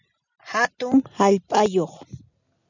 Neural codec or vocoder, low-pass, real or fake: none; 7.2 kHz; real